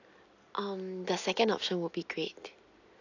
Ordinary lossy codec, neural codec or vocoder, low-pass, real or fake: AAC, 48 kbps; none; 7.2 kHz; real